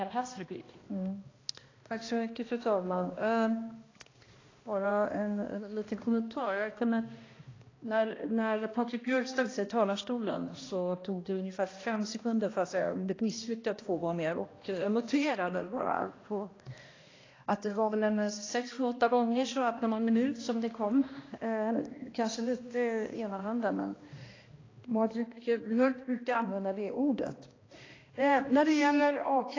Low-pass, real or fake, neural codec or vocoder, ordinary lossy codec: 7.2 kHz; fake; codec, 16 kHz, 1 kbps, X-Codec, HuBERT features, trained on balanced general audio; AAC, 32 kbps